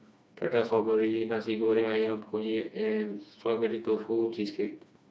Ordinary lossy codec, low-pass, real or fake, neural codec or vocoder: none; none; fake; codec, 16 kHz, 2 kbps, FreqCodec, smaller model